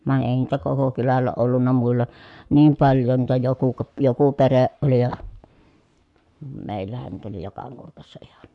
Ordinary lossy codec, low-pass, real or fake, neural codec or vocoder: none; 10.8 kHz; fake; codec, 44.1 kHz, 7.8 kbps, Pupu-Codec